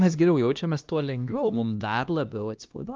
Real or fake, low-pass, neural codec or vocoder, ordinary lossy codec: fake; 7.2 kHz; codec, 16 kHz, 1 kbps, X-Codec, HuBERT features, trained on LibriSpeech; Opus, 24 kbps